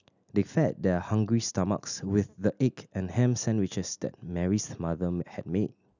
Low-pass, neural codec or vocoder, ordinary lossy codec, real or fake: 7.2 kHz; none; none; real